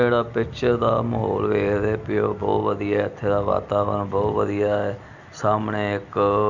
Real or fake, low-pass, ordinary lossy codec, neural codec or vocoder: real; 7.2 kHz; Opus, 64 kbps; none